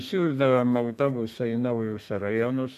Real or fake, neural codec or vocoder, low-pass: fake; codec, 32 kHz, 1.9 kbps, SNAC; 14.4 kHz